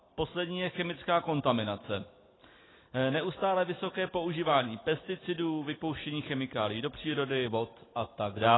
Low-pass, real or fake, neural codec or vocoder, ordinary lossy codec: 7.2 kHz; real; none; AAC, 16 kbps